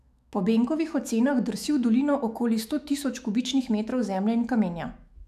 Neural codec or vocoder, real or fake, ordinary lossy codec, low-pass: autoencoder, 48 kHz, 128 numbers a frame, DAC-VAE, trained on Japanese speech; fake; none; 14.4 kHz